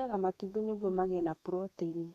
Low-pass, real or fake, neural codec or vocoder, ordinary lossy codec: 14.4 kHz; fake; codec, 32 kHz, 1.9 kbps, SNAC; MP3, 96 kbps